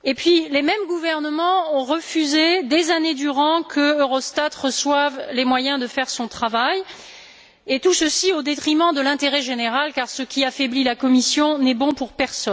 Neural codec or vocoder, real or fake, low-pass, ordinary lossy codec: none; real; none; none